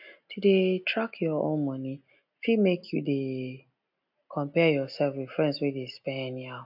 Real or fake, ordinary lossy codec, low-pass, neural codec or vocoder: real; AAC, 48 kbps; 5.4 kHz; none